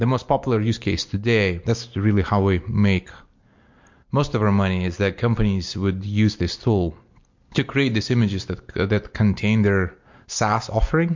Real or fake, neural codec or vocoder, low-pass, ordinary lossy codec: real; none; 7.2 kHz; MP3, 48 kbps